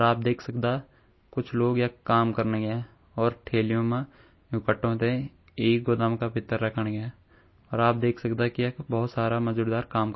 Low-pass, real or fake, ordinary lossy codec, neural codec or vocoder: 7.2 kHz; real; MP3, 24 kbps; none